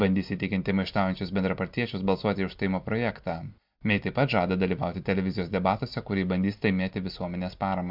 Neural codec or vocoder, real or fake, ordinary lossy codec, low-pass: none; real; MP3, 48 kbps; 5.4 kHz